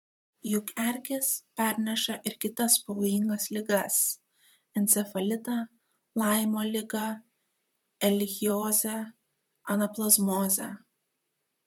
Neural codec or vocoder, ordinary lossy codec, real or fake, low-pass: vocoder, 44.1 kHz, 128 mel bands, Pupu-Vocoder; MP3, 96 kbps; fake; 19.8 kHz